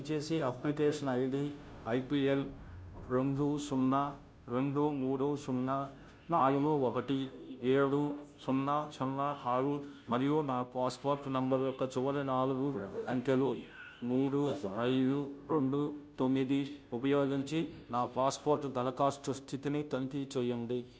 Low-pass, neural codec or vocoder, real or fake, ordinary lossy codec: none; codec, 16 kHz, 0.5 kbps, FunCodec, trained on Chinese and English, 25 frames a second; fake; none